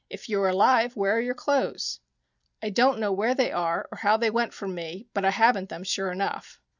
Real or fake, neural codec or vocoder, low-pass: real; none; 7.2 kHz